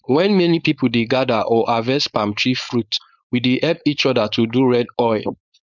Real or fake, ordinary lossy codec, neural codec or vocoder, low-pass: fake; none; codec, 16 kHz, 4.8 kbps, FACodec; 7.2 kHz